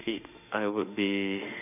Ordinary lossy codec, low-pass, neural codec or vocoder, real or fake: none; 3.6 kHz; autoencoder, 48 kHz, 32 numbers a frame, DAC-VAE, trained on Japanese speech; fake